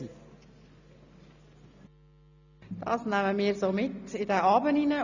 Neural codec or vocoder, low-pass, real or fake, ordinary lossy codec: none; 7.2 kHz; real; none